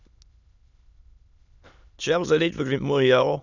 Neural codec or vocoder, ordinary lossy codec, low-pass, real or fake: autoencoder, 22.05 kHz, a latent of 192 numbers a frame, VITS, trained on many speakers; none; 7.2 kHz; fake